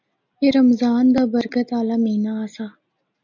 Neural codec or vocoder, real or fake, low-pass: none; real; 7.2 kHz